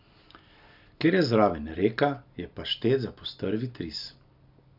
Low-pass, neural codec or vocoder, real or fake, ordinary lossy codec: 5.4 kHz; none; real; none